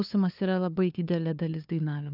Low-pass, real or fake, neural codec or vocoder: 5.4 kHz; fake; codec, 16 kHz, 2 kbps, FunCodec, trained on LibriTTS, 25 frames a second